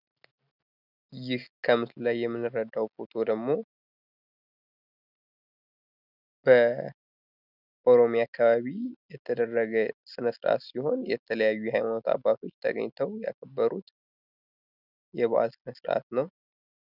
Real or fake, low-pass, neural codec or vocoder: real; 5.4 kHz; none